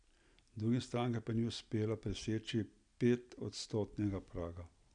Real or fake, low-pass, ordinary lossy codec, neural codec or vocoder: real; 9.9 kHz; none; none